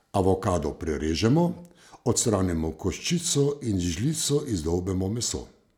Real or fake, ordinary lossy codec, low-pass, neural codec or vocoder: real; none; none; none